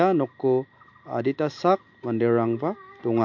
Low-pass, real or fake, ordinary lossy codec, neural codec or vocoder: 7.2 kHz; real; MP3, 48 kbps; none